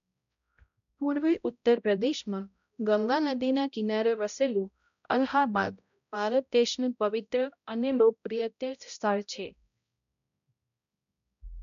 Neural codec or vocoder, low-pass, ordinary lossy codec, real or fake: codec, 16 kHz, 0.5 kbps, X-Codec, HuBERT features, trained on balanced general audio; 7.2 kHz; MP3, 96 kbps; fake